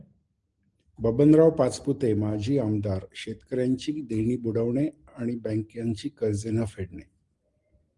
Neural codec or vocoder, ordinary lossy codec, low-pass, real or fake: none; Opus, 24 kbps; 10.8 kHz; real